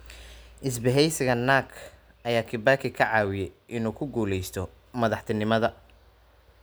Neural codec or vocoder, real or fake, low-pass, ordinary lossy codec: none; real; none; none